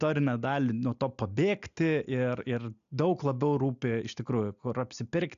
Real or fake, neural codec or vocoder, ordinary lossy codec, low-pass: real; none; AAC, 96 kbps; 7.2 kHz